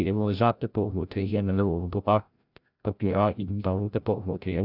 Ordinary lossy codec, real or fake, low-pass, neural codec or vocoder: none; fake; 5.4 kHz; codec, 16 kHz, 0.5 kbps, FreqCodec, larger model